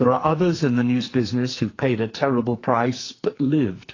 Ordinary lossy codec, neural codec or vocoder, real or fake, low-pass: AAC, 32 kbps; codec, 44.1 kHz, 2.6 kbps, SNAC; fake; 7.2 kHz